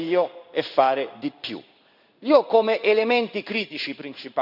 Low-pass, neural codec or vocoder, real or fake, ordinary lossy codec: 5.4 kHz; codec, 16 kHz in and 24 kHz out, 1 kbps, XY-Tokenizer; fake; none